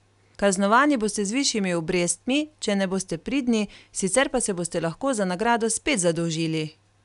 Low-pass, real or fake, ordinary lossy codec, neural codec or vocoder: 10.8 kHz; real; none; none